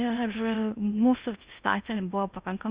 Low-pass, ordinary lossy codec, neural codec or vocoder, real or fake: 3.6 kHz; Opus, 64 kbps; codec, 16 kHz in and 24 kHz out, 0.8 kbps, FocalCodec, streaming, 65536 codes; fake